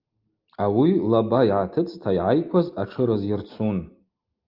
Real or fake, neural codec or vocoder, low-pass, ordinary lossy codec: real; none; 5.4 kHz; Opus, 24 kbps